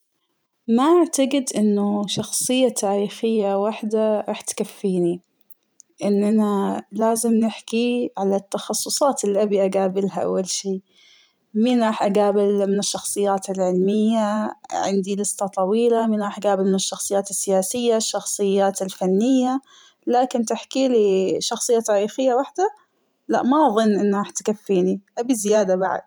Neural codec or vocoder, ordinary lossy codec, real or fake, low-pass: vocoder, 44.1 kHz, 128 mel bands every 512 samples, BigVGAN v2; none; fake; none